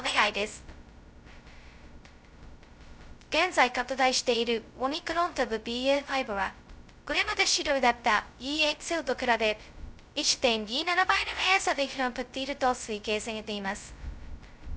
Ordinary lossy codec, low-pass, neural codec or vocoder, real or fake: none; none; codec, 16 kHz, 0.2 kbps, FocalCodec; fake